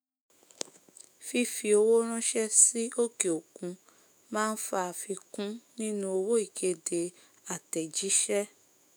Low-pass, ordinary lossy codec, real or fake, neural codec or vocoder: none; none; fake; autoencoder, 48 kHz, 128 numbers a frame, DAC-VAE, trained on Japanese speech